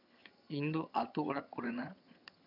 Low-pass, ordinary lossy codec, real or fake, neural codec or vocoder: 5.4 kHz; none; fake; vocoder, 22.05 kHz, 80 mel bands, HiFi-GAN